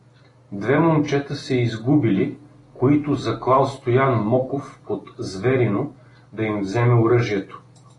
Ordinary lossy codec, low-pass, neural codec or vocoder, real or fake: AAC, 32 kbps; 10.8 kHz; none; real